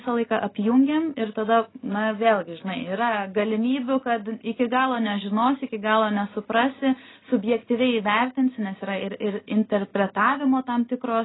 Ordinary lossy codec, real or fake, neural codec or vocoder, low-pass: AAC, 16 kbps; real; none; 7.2 kHz